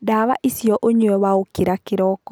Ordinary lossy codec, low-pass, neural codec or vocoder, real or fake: none; none; none; real